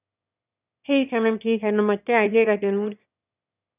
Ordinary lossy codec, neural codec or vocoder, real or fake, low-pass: none; autoencoder, 22.05 kHz, a latent of 192 numbers a frame, VITS, trained on one speaker; fake; 3.6 kHz